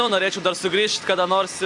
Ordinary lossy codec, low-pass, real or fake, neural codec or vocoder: AAC, 64 kbps; 10.8 kHz; fake; vocoder, 44.1 kHz, 128 mel bands every 256 samples, BigVGAN v2